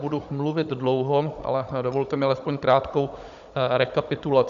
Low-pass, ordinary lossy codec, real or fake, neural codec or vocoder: 7.2 kHz; Opus, 64 kbps; fake; codec, 16 kHz, 16 kbps, FunCodec, trained on Chinese and English, 50 frames a second